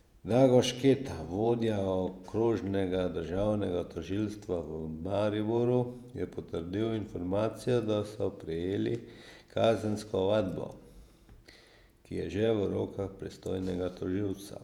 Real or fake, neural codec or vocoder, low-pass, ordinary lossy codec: fake; vocoder, 48 kHz, 128 mel bands, Vocos; 19.8 kHz; none